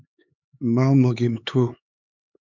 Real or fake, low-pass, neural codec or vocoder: fake; 7.2 kHz; codec, 16 kHz, 4 kbps, X-Codec, HuBERT features, trained on LibriSpeech